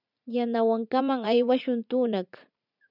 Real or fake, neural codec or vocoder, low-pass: fake; vocoder, 44.1 kHz, 80 mel bands, Vocos; 5.4 kHz